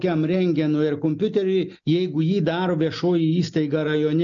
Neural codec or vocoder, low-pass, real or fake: none; 7.2 kHz; real